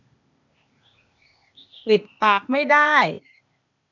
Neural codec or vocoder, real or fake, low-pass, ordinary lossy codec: codec, 16 kHz, 0.8 kbps, ZipCodec; fake; 7.2 kHz; none